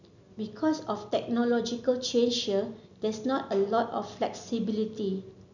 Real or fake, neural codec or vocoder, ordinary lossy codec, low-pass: real; none; none; 7.2 kHz